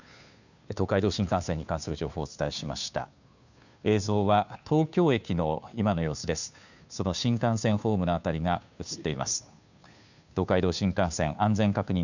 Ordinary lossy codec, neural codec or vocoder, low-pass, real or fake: none; codec, 16 kHz, 2 kbps, FunCodec, trained on Chinese and English, 25 frames a second; 7.2 kHz; fake